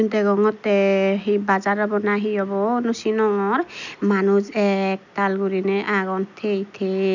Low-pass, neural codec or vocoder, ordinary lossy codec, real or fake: 7.2 kHz; none; none; real